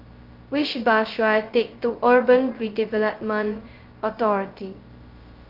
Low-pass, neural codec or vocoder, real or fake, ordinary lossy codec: 5.4 kHz; codec, 16 kHz, 0.2 kbps, FocalCodec; fake; Opus, 32 kbps